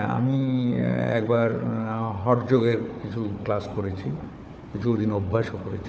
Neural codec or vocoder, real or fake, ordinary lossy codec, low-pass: codec, 16 kHz, 4 kbps, FunCodec, trained on Chinese and English, 50 frames a second; fake; none; none